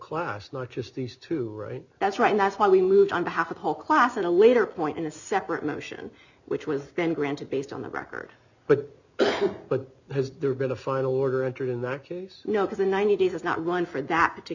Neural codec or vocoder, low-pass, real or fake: none; 7.2 kHz; real